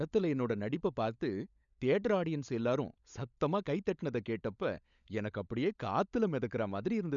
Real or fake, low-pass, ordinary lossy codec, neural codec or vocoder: fake; 7.2 kHz; none; codec, 16 kHz, 16 kbps, FunCodec, trained on LibriTTS, 50 frames a second